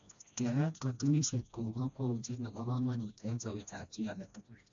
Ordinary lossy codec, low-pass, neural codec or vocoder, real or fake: AAC, 64 kbps; 7.2 kHz; codec, 16 kHz, 1 kbps, FreqCodec, smaller model; fake